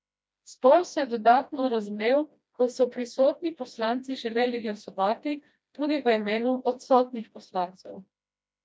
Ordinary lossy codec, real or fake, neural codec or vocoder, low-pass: none; fake; codec, 16 kHz, 1 kbps, FreqCodec, smaller model; none